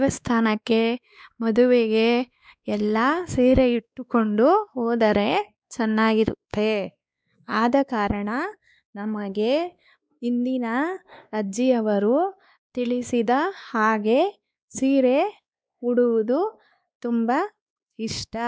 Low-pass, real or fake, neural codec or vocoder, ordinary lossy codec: none; fake; codec, 16 kHz, 2 kbps, X-Codec, WavLM features, trained on Multilingual LibriSpeech; none